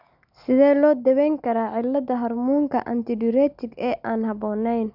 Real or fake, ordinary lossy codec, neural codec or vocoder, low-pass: real; none; none; 5.4 kHz